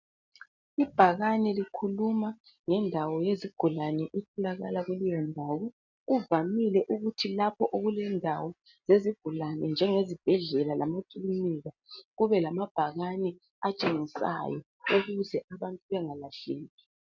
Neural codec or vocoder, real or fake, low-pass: none; real; 7.2 kHz